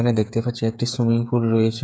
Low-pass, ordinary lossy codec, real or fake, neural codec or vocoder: none; none; fake; codec, 16 kHz, 8 kbps, FreqCodec, smaller model